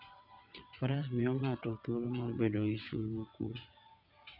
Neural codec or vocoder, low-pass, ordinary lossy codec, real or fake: vocoder, 22.05 kHz, 80 mel bands, WaveNeXt; 5.4 kHz; none; fake